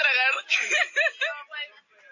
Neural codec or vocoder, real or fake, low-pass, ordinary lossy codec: none; real; 7.2 kHz; AAC, 48 kbps